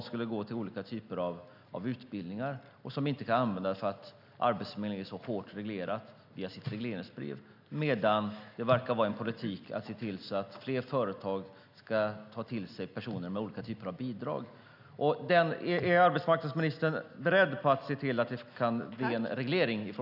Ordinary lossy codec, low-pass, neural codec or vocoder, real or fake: none; 5.4 kHz; none; real